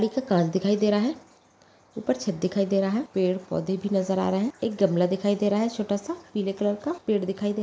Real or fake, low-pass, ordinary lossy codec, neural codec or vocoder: real; none; none; none